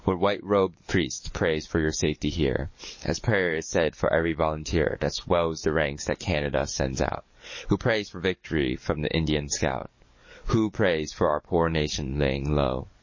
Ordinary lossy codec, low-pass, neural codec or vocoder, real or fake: MP3, 32 kbps; 7.2 kHz; codec, 44.1 kHz, 7.8 kbps, DAC; fake